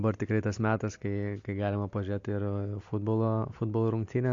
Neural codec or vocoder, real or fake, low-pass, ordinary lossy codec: none; real; 7.2 kHz; AAC, 48 kbps